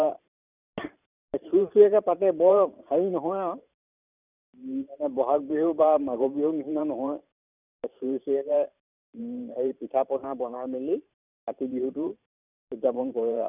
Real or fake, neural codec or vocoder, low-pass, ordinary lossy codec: fake; vocoder, 44.1 kHz, 128 mel bands every 512 samples, BigVGAN v2; 3.6 kHz; none